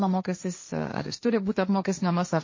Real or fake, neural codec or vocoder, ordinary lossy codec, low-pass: fake; codec, 16 kHz, 1.1 kbps, Voila-Tokenizer; MP3, 32 kbps; 7.2 kHz